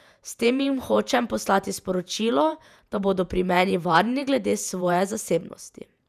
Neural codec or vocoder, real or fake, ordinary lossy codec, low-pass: vocoder, 48 kHz, 128 mel bands, Vocos; fake; none; 14.4 kHz